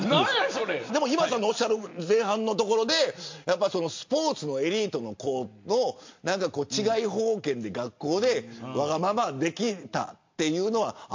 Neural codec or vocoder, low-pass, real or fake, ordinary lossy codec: none; 7.2 kHz; real; MP3, 48 kbps